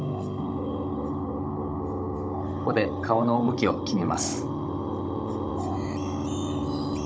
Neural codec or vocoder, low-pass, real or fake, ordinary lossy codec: codec, 16 kHz, 16 kbps, FunCodec, trained on Chinese and English, 50 frames a second; none; fake; none